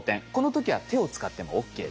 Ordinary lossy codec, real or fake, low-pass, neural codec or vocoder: none; real; none; none